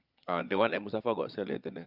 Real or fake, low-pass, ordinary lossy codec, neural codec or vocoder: fake; 5.4 kHz; Opus, 64 kbps; vocoder, 22.05 kHz, 80 mel bands, Vocos